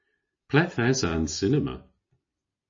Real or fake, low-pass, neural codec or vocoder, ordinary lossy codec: real; 7.2 kHz; none; MP3, 32 kbps